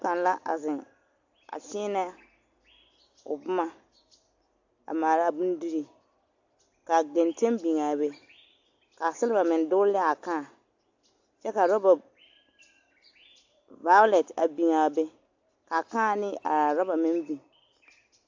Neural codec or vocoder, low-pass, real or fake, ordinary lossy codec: none; 7.2 kHz; real; AAC, 48 kbps